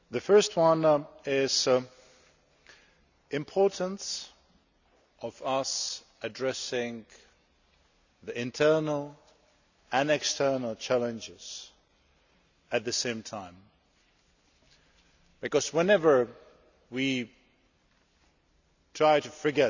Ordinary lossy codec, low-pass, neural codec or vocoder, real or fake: none; 7.2 kHz; none; real